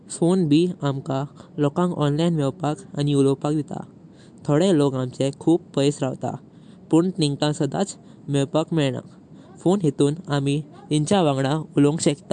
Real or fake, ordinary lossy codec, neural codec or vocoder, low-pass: real; MP3, 64 kbps; none; 10.8 kHz